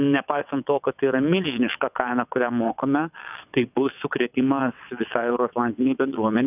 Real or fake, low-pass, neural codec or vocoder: fake; 3.6 kHz; vocoder, 22.05 kHz, 80 mel bands, WaveNeXt